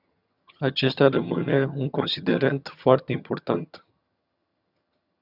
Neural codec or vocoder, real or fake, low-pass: vocoder, 22.05 kHz, 80 mel bands, HiFi-GAN; fake; 5.4 kHz